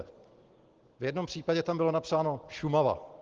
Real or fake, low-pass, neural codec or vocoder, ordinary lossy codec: real; 7.2 kHz; none; Opus, 16 kbps